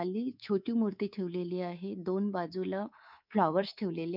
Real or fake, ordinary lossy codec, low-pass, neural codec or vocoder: fake; none; 5.4 kHz; codec, 24 kHz, 3.1 kbps, DualCodec